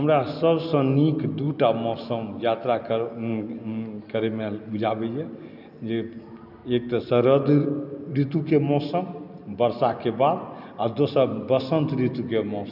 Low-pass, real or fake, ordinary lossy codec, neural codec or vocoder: 5.4 kHz; real; none; none